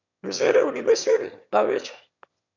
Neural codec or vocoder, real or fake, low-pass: autoencoder, 22.05 kHz, a latent of 192 numbers a frame, VITS, trained on one speaker; fake; 7.2 kHz